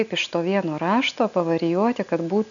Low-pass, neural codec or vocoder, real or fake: 7.2 kHz; none; real